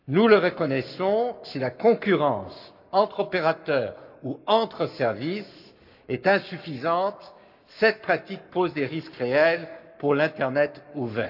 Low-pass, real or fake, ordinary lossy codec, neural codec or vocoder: 5.4 kHz; fake; none; codec, 44.1 kHz, 7.8 kbps, Pupu-Codec